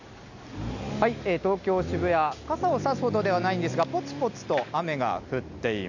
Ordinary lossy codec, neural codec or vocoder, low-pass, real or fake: none; none; 7.2 kHz; real